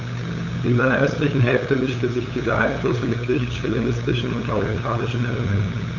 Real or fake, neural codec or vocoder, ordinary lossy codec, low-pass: fake; codec, 16 kHz, 8 kbps, FunCodec, trained on LibriTTS, 25 frames a second; none; 7.2 kHz